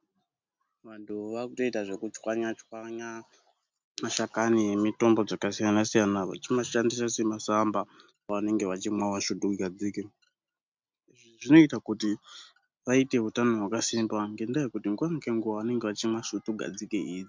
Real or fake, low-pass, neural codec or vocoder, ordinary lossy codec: real; 7.2 kHz; none; MP3, 64 kbps